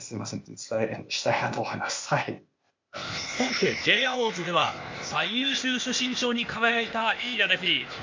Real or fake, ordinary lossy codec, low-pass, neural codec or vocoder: fake; MP3, 48 kbps; 7.2 kHz; codec, 16 kHz, 0.8 kbps, ZipCodec